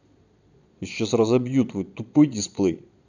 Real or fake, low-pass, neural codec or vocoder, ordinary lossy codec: fake; 7.2 kHz; vocoder, 22.05 kHz, 80 mel bands, Vocos; none